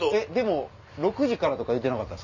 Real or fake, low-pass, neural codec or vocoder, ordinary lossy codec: real; 7.2 kHz; none; none